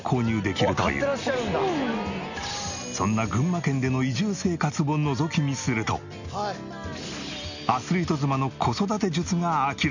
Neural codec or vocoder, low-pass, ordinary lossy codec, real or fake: none; 7.2 kHz; none; real